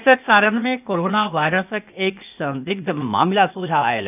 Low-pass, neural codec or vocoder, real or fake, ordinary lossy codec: 3.6 kHz; codec, 16 kHz, 0.8 kbps, ZipCodec; fake; none